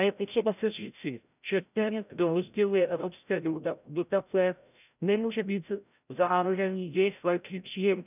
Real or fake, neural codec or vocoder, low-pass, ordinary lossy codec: fake; codec, 16 kHz, 0.5 kbps, FreqCodec, larger model; 3.6 kHz; none